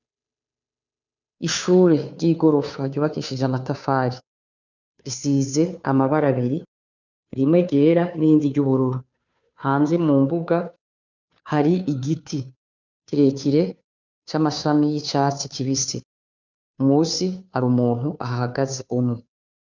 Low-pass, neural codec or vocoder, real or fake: 7.2 kHz; codec, 16 kHz, 2 kbps, FunCodec, trained on Chinese and English, 25 frames a second; fake